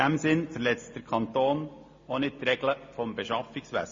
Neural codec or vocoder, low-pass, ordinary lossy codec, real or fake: none; 7.2 kHz; MP3, 32 kbps; real